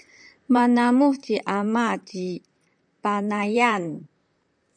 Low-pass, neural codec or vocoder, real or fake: 9.9 kHz; vocoder, 44.1 kHz, 128 mel bands, Pupu-Vocoder; fake